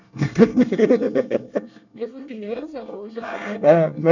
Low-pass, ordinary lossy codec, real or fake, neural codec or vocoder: 7.2 kHz; none; fake; codec, 24 kHz, 1 kbps, SNAC